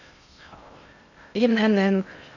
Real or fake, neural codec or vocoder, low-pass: fake; codec, 16 kHz in and 24 kHz out, 0.6 kbps, FocalCodec, streaming, 2048 codes; 7.2 kHz